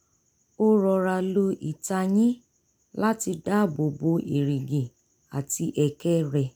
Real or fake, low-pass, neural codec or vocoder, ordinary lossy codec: real; none; none; none